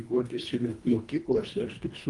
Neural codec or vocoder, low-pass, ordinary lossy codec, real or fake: codec, 24 kHz, 1.5 kbps, HILCodec; 10.8 kHz; Opus, 32 kbps; fake